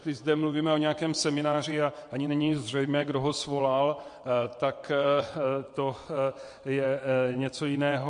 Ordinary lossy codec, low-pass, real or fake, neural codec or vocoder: MP3, 48 kbps; 9.9 kHz; fake; vocoder, 22.05 kHz, 80 mel bands, WaveNeXt